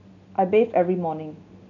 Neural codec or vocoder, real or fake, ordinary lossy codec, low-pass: none; real; none; 7.2 kHz